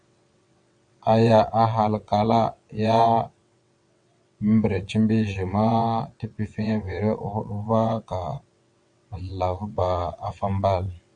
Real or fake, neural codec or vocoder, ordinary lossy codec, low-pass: fake; vocoder, 22.05 kHz, 80 mel bands, WaveNeXt; MP3, 64 kbps; 9.9 kHz